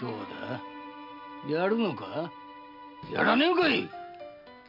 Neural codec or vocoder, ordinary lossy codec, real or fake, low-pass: none; none; real; 5.4 kHz